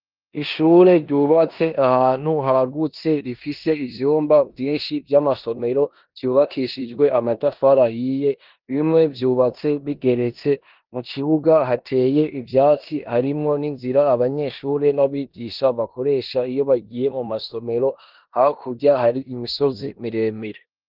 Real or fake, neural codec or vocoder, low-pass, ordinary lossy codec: fake; codec, 16 kHz in and 24 kHz out, 0.9 kbps, LongCat-Audio-Codec, four codebook decoder; 5.4 kHz; Opus, 32 kbps